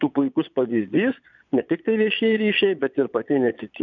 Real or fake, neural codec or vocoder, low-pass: fake; vocoder, 22.05 kHz, 80 mel bands, Vocos; 7.2 kHz